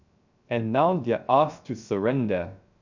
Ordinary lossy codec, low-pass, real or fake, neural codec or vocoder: none; 7.2 kHz; fake; codec, 16 kHz, 0.3 kbps, FocalCodec